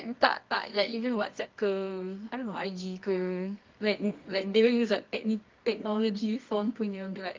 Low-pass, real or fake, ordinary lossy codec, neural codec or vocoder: 7.2 kHz; fake; Opus, 24 kbps; codec, 24 kHz, 0.9 kbps, WavTokenizer, medium music audio release